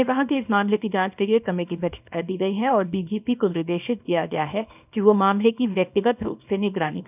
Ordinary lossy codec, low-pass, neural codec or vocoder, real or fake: none; 3.6 kHz; codec, 24 kHz, 0.9 kbps, WavTokenizer, small release; fake